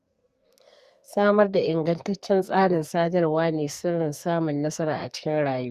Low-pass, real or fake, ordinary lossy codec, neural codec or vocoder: 14.4 kHz; fake; Opus, 64 kbps; codec, 44.1 kHz, 2.6 kbps, SNAC